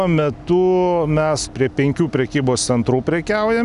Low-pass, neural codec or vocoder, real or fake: 10.8 kHz; none; real